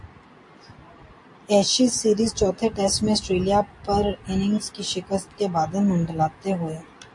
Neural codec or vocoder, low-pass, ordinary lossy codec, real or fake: none; 10.8 kHz; AAC, 64 kbps; real